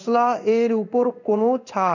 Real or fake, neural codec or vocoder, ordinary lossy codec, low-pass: fake; codec, 16 kHz in and 24 kHz out, 1 kbps, XY-Tokenizer; none; 7.2 kHz